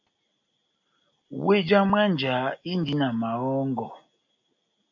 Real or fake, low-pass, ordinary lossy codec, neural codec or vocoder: real; 7.2 kHz; AAC, 48 kbps; none